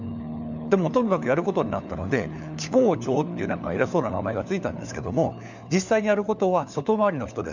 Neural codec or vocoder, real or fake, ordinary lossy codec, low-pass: codec, 16 kHz, 4 kbps, FunCodec, trained on LibriTTS, 50 frames a second; fake; none; 7.2 kHz